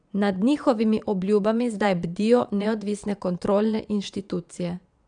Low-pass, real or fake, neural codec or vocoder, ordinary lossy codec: 9.9 kHz; fake; vocoder, 22.05 kHz, 80 mel bands, Vocos; none